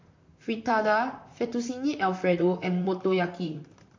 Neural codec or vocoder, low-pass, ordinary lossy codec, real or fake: vocoder, 44.1 kHz, 128 mel bands, Pupu-Vocoder; 7.2 kHz; MP3, 48 kbps; fake